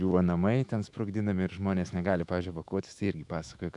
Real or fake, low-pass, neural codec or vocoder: fake; 10.8 kHz; autoencoder, 48 kHz, 128 numbers a frame, DAC-VAE, trained on Japanese speech